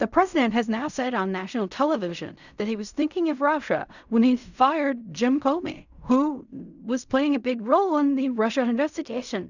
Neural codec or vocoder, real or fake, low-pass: codec, 16 kHz in and 24 kHz out, 0.4 kbps, LongCat-Audio-Codec, fine tuned four codebook decoder; fake; 7.2 kHz